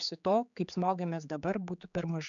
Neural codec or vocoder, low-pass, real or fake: codec, 16 kHz, 4 kbps, X-Codec, HuBERT features, trained on general audio; 7.2 kHz; fake